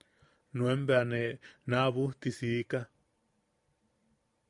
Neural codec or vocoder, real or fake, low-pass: vocoder, 44.1 kHz, 128 mel bands every 512 samples, BigVGAN v2; fake; 10.8 kHz